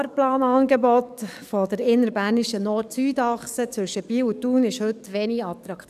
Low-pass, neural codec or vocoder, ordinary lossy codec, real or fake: 14.4 kHz; codec, 44.1 kHz, 7.8 kbps, DAC; none; fake